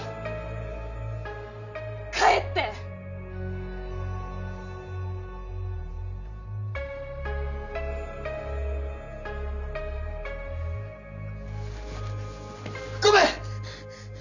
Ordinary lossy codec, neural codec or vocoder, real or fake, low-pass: none; none; real; 7.2 kHz